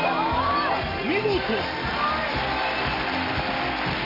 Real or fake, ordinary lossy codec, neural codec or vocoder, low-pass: real; none; none; 5.4 kHz